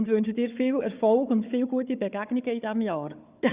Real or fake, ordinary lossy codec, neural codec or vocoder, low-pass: fake; Opus, 64 kbps; codec, 16 kHz, 4 kbps, FunCodec, trained on Chinese and English, 50 frames a second; 3.6 kHz